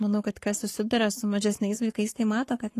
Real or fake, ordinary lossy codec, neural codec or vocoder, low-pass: fake; AAC, 48 kbps; codec, 44.1 kHz, 7.8 kbps, Pupu-Codec; 14.4 kHz